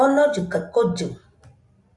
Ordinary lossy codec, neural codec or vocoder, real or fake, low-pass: Opus, 64 kbps; none; real; 10.8 kHz